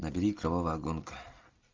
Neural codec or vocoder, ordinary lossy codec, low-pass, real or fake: none; Opus, 32 kbps; 7.2 kHz; real